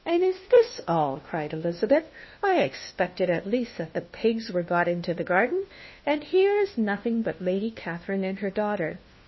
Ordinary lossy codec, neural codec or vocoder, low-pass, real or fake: MP3, 24 kbps; codec, 16 kHz, 1 kbps, FunCodec, trained on LibriTTS, 50 frames a second; 7.2 kHz; fake